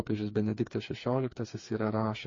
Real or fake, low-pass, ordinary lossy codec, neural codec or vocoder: fake; 7.2 kHz; MP3, 32 kbps; codec, 16 kHz, 4 kbps, FreqCodec, smaller model